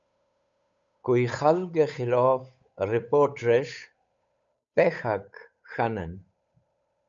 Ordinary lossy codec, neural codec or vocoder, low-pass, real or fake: MP3, 64 kbps; codec, 16 kHz, 8 kbps, FunCodec, trained on Chinese and English, 25 frames a second; 7.2 kHz; fake